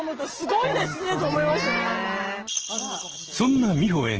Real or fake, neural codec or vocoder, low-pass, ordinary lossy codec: real; none; 7.2 kHz; Opus, 16 kbps